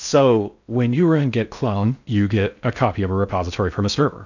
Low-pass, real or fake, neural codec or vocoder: 7.2 kHz; fake; codec, 16 kHz in and 24 kHz out, 0.6 kbps, FocalCodec, streaming, 4096 codes